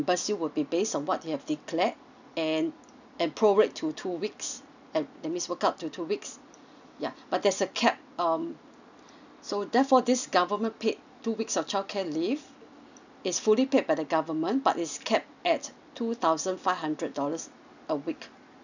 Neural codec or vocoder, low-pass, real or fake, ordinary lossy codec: none; 7.2 kHz; real; none